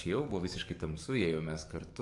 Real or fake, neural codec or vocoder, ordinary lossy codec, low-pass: fake; codec, 44.1 kHz, 7.8 kbps, DAC; AAC, 64 kbps; 10.8 kHz